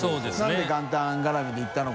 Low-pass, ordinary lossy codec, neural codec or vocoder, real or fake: none; none; none; real